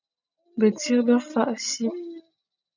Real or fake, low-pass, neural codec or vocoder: real; 7.2 kHz; none